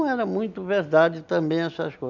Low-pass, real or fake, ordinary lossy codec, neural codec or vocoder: 7.2 kHz; real; none; none